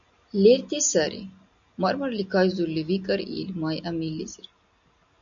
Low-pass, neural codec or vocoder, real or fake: 7.2 kHz; none; real